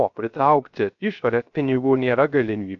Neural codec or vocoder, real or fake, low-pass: codec, 16 kHz, 0.3 kbps, FocalCodec; fake; 7.2 kHz